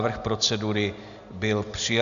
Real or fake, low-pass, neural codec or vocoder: real; 7.2 kHz; none